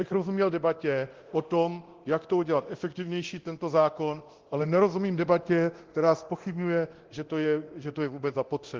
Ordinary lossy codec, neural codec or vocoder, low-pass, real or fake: Opus, 16 kbps; codec, 24 kHz, 0.9 kbps, DualCodec; 7.2 kHz; fake